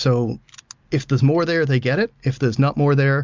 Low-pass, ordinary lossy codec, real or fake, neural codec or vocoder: 7.2 kHz; MP3, 64 kbps; real; none